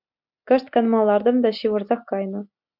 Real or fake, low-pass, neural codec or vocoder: real; 5.4 kHz; none